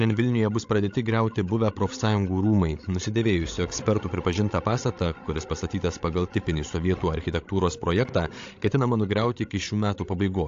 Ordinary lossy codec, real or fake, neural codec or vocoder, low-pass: AAC, 48 kbps; fake; codec, 16 kHz, 16 kbps, FreqCodec, larger model; 7.2 kHz